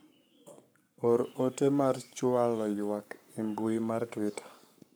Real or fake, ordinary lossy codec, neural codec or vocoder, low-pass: fake; none; codec, 44.1 kHz, 7.8 kbps, Pupu-Codec; none